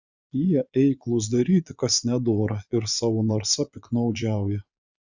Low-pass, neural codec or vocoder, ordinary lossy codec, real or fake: 7.2 kHz; vocoder, 22.05 kHz, 80 mel bands, Vocos; Opus, 64 kbps; fake